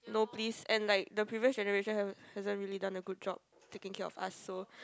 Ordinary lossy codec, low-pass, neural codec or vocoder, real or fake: none; none; none; real